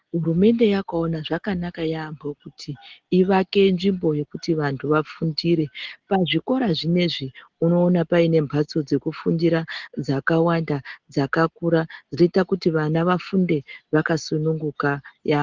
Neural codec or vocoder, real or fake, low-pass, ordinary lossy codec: none; real; 7.2 kHz; Opus, 16 kbps